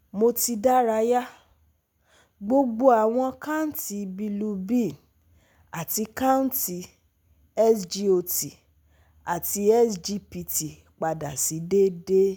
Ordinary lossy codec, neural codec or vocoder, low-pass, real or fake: none; none; none; real